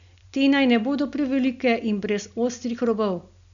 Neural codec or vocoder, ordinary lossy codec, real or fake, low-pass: none; MP3, 96 kbps; real; 7.2 kHz